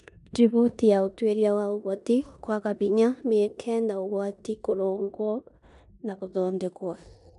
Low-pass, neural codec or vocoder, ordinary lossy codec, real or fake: 10.8 kHz; codec, 16 kHz in and 24 kHz out, 0.9 kbps, LongCat-Audio-Codec, four codebook decoder; none; fake